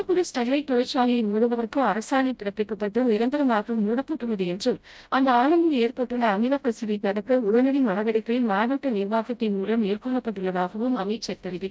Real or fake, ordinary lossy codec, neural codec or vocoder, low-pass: fake; none; codec, 16 kHz, 0.5 kbps, FreqCodec, smaller model; none